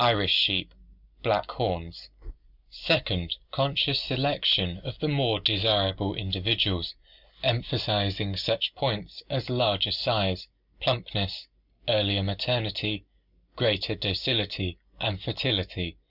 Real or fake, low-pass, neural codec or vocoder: real; 5.4 kHz; none